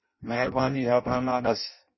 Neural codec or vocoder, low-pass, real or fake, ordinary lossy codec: codec, 16 kHz in and 24 kHz out, 0.6 kbps, FireRedTTS-2 codec; 7.2 kHz; fake; MP3, 24 kbps